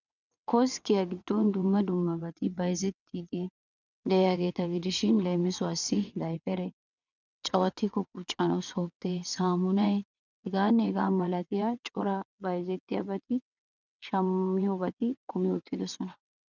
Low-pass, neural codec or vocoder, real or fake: 7.2 kHz; vocoder, 22.05 kHz, 80 mel bands, WaveNeXt; fake